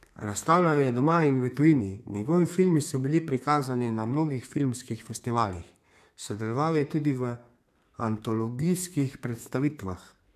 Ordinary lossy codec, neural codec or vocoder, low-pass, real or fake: none; codec, 32 kHz, 1.9 kbps, SNAC; 14.4 kHz; fake